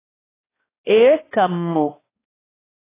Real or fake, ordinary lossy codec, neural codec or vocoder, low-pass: fake; AAC, 16 kbps; codec, 16 kHz, 1 kbps, X-Codec, HuBERT features, trained on balanced general audio; 3.6 kHz